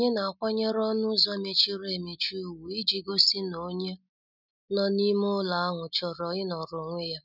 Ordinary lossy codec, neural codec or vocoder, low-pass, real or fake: none; none; 5.4 kHz; real